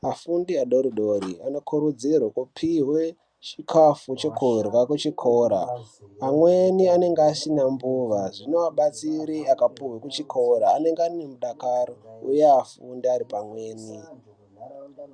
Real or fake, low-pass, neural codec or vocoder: real; 9.9 kHz; none